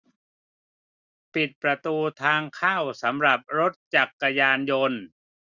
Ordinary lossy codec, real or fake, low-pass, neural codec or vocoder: none; real; 7.2 kHz; none